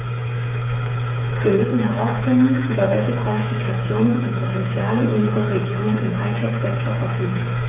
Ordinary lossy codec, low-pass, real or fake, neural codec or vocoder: none; 3.6 kHz; fake; codec, 16 kHz, 8 kbps, FreqCodec, smaller model